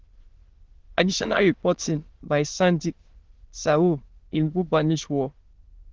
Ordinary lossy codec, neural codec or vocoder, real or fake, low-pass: Opus, 16 kbps; autoencoder, 22.05 kHz, a latent of 192 numbers a frame, VITS, trained on many speakers; fake; 7.2 kHz